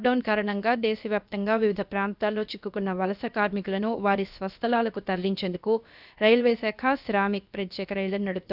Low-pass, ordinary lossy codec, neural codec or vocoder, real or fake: 5.4 kHz; none; codec, 16 kHz, about 1 kbps, DyCAST, with the encoder's durations; fake